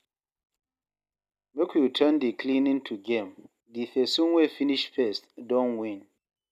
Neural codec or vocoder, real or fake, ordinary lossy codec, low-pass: none; real; none; 14.4 kHz